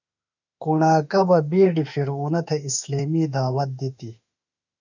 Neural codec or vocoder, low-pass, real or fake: autoencoder, 48 kHz, 32 numbers a frame, DAC-VAE, trained on Japanese speech; 7.2 kHz; fake